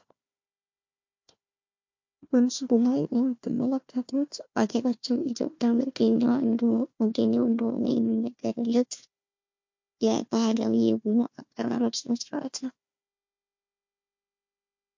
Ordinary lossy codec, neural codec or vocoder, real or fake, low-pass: MP3, 48 kbps; codec, 16 kHz, 1 kbps, FunCodec, trained on Chinese and English, 50 frames a second; fake; 7.2 kHz